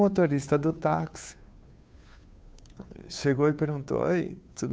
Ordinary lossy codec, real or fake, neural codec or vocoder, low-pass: none; fake; codec, 16 kHz, 2 kbps, FunCodec, trained on Chinese and English, 25 frames a second; none